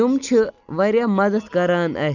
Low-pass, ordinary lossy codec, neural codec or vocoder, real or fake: 7.2 kHz; none; none; real